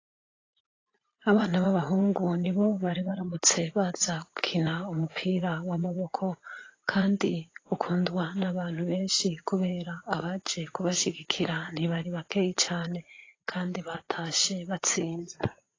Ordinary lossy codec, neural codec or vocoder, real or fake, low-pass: AAC, 32 kbps; vocoder, 44.1 kHz, 80 mel bands, Vocos; fake; 7.2 kHz